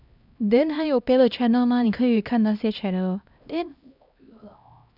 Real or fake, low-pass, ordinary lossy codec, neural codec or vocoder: fake; 5.4 kHz; none; codec, 16 kHz, 1 kbps, X-Codec, HuBERT features, trained on LibriSpeech